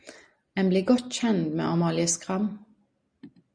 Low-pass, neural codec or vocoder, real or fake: 9.9 kHz; none; real